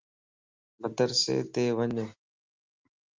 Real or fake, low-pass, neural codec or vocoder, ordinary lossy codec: real; 7.2 kHz; none; Opus, 32 kbps